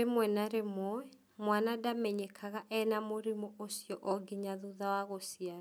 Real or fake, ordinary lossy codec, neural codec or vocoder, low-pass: real; none; none; none